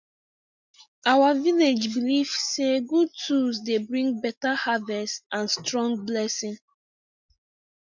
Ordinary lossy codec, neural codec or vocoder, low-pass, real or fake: MP3, 64 kbps; none; 7.2 kHz; real